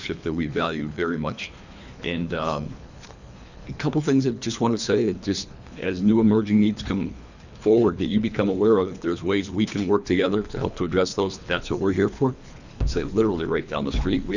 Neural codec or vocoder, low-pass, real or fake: codec, 24 kHz, 3 kbps, HILCodec; 7.2 kHz; fake